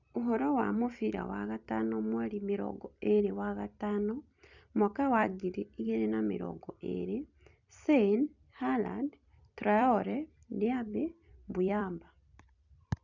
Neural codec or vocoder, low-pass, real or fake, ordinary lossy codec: vocoder, 44.1 kHz, 128 mel bands every 256 samples, BigVGAN v2; 7.2 kHz; fake; none